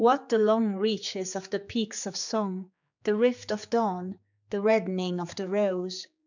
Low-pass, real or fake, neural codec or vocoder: 7.2 kHz; fake; codec, 16 kHz, 4 kbps, X-Codec, HuBERT features, trained on general audio